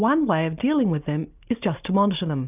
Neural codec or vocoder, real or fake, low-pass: none; real; 3.6 kHz